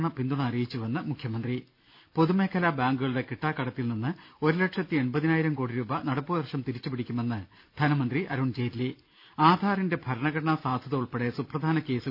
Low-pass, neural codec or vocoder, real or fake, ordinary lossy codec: 5.4 kHz; none; real; none